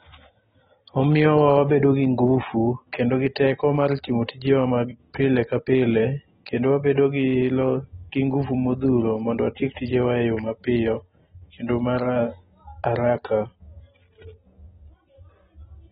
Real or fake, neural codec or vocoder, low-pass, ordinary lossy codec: real; none; 10.8 kHz; AAC, 16 kbps